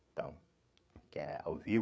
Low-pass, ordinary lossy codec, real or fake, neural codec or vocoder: none; none; fake; codec, 16 kHz, 8 kbps, FreqCodec, larger model